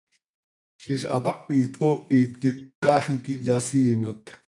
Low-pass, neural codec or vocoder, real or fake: 10.8 kHz; codec, 24 kHz, 0.9 kbps, WavTokenizer, medium music audio release; fake